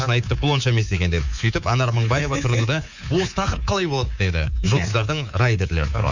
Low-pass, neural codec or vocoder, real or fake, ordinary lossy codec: 7.2 kHz; codec, 24 kHz, 3.1 kbps, DualCodec; fake; none